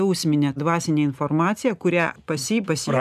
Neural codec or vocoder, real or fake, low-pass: none; real; 14.4 kHz